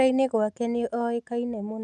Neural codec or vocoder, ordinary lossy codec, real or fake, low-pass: none; none; real; none